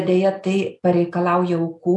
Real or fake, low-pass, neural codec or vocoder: real; 10.8 kHz; none